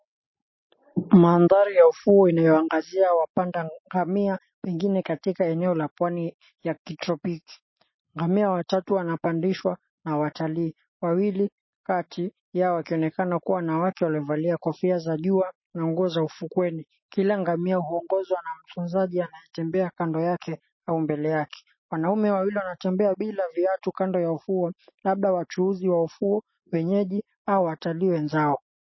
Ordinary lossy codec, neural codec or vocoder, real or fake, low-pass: MP3, 24 kbps; none; real; 7.2 kHz